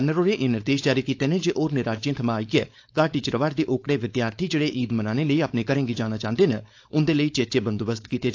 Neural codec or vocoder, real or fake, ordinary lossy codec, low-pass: codec, 16 kHz, 4.8 kbps, FACodec; fake; AAC, 48 kbps; 7.2 kHz